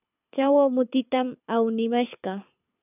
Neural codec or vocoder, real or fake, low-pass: codec, 24 kHz, 6 kbps, HILCodec; fake; 3.6 kHz